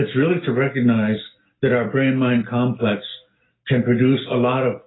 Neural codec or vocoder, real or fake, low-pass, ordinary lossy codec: none; real; 7.2 kHz; AAC, 16 kbps